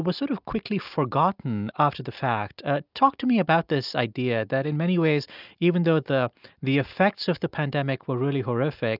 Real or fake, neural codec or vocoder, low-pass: real; none; 5.4 kHz